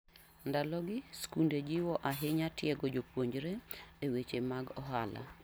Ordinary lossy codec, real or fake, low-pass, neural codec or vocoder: none; real; none; none